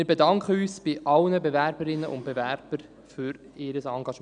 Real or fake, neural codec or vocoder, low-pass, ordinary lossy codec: real; none; 9.9 kHz; none